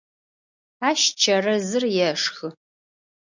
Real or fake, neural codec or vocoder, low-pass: real; none; 7.2 kHz